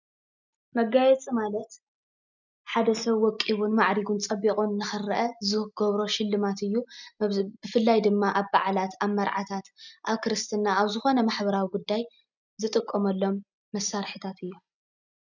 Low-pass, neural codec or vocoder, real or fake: 7.2 kHz; none; real